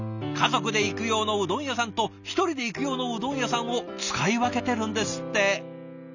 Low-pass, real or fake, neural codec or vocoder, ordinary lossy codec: 7.2 kHz; real; none; none